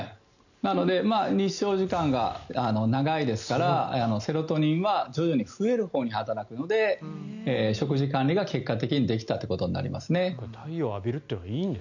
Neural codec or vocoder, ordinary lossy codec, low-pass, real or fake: none; none; 7.2 kHz; real